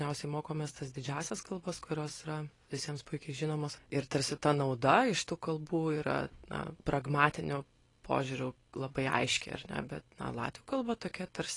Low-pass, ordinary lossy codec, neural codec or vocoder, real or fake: 10.8 kHz; AAC, 32 kbps; none; real